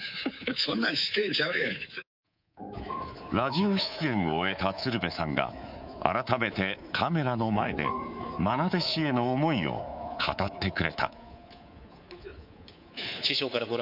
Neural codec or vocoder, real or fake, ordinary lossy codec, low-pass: codec, 24 kHz, 3.1 kbps, DualCodec; fake; none; 5.4 kHz